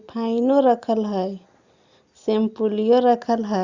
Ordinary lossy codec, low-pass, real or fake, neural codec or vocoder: Opus, 64 kbps; 7.2 kHz; real; none